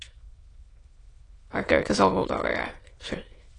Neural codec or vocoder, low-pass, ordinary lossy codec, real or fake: autoencoder, 22.05 kHz, a latent of 192 numbers a frame, VITS, trained on many speakers; 9.9 kHz; AAC, 32 kbps; fake